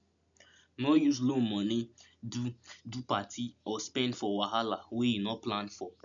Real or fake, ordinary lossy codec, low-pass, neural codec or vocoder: real; none; 7.2 kHz; none